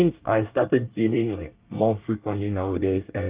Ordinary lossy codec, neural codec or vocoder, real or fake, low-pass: Opus, 24 kbps; codec, 24 kHz, 1 kbps, SNAC; fake; 3.6 kHz